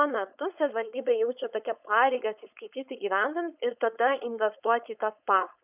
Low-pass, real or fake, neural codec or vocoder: 3.6 kHz; fake; codec, 16 kHz, 4.8 kbps, FACodec